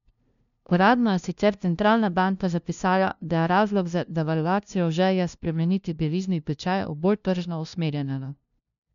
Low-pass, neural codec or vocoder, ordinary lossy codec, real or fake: 7.2 kHz; codec, 16 kHz, 0.5 kbps, FunCodec, trained on LibriTTS, 25 frames a second; none; fake